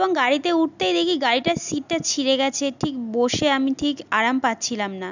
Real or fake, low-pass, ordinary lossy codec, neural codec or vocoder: real; 7.2 kHz; none; none